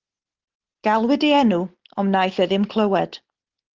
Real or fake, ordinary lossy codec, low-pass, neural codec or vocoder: real; Opus, 16 kbps; 7.2 kHz; none